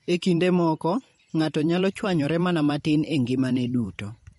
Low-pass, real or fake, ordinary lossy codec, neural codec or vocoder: 19.8 kHz; fake; MP3, 48 kbps; vocoder, 44.1 kHz, 128 mel bands every 512 samples, BigVGAN v2